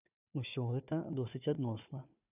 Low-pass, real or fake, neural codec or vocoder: 3.6 kHz; fake; codec, 16 kHz, 4 kbps, FunCodec, trained on Chinese and English, 50 frames a second